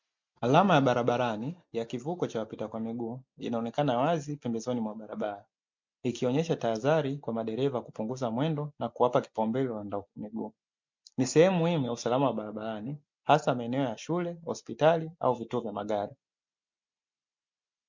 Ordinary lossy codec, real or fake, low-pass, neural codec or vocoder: MP3, 64 kbps; real; 7.2 kHz; none